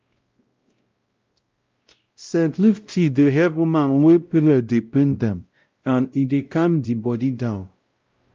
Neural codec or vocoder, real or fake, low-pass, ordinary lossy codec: codec, 16 kHz, 0.5 kbps, X-Codec, WavLM features, trained on Multilingual LibriSpeech; fake; 7.2 kHz; Opus, 24 kbps